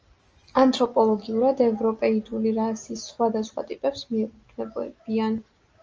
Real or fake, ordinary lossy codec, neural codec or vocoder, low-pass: real; Opus, 24 kbps; none; 7.2 kHz